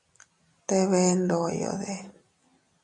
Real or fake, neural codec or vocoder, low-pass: real; none; 10.8 kHz